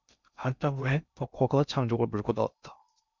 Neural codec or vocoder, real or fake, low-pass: codec, 16 kHz in and 24 kHz out, 0.6 kbps, FocalCodec, streaming, 2048 codes; fake; 7.2 kHz